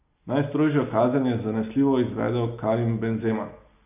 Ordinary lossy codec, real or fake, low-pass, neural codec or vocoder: none; real; 3.6 kHz; none